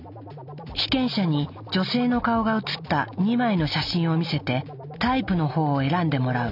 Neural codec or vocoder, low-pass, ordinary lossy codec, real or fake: none; 5.4 kHz; none; real